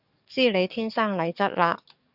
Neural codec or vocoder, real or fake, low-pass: codec, 44.1 kHz, 7.8 kbps, DAC; fake; 5.4 kHz